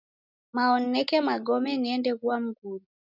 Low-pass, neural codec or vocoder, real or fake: 5.4 kHz; none; real